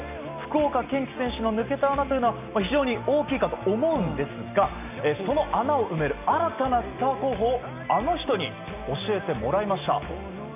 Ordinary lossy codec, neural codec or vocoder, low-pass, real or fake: none; none; 3.6 kHz; real